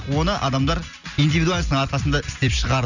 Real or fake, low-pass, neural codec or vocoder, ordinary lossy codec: real; 7.2 kHz; none; none